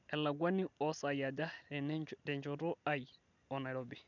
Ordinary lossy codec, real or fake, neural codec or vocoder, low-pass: none; real; none; 7.2 kHz